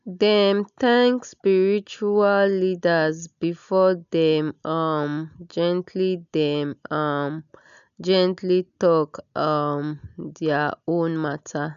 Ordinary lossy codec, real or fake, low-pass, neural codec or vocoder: none; real; 7.2 kHz; none